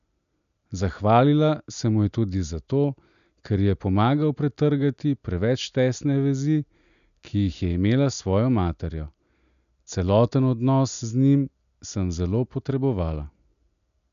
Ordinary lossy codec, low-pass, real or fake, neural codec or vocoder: none; 7.2 kHz; real; none